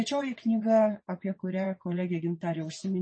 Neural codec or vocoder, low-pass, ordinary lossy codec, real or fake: vocoder, 22.05 kHz, 80 mel bands, WaveNeXt; 9.9 kHz; MP3, 32 kbps; fake